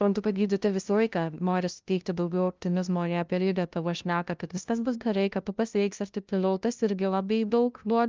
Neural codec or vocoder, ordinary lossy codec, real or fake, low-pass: codec, 16 kHz, 0.5 kbps, FunCodec, trained on LibriTTS, 25 frames a second; Opus, 24 kbps; fake; 7.2 kHz